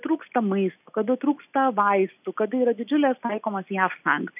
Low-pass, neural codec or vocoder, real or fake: 3.6 kHz; none; real